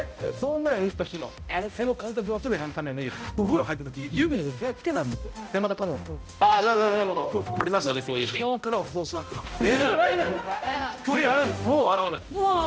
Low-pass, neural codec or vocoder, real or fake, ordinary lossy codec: none; codec, 16 kHz, 0.5 kbps, X-Codec, HuBERT features, trained on balanced general audio; fake; none